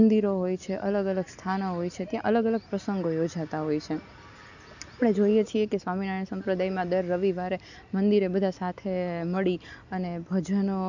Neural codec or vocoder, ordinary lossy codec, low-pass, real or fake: none; none; 7.2 kHz; real